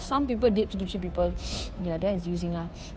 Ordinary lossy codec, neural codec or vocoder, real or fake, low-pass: none; codec, 16 kHz, 2 kbps, FunCodec, trained on Chinese and English, 25 frames a second; fake; none